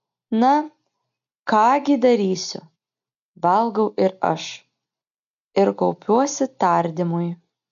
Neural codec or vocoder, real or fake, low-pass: none; real; 7.2 kHz